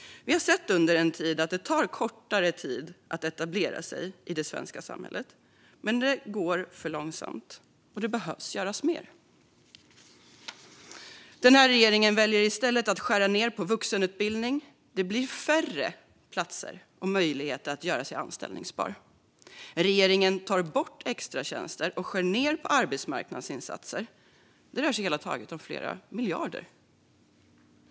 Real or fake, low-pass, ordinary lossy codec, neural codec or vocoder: real; none; none; none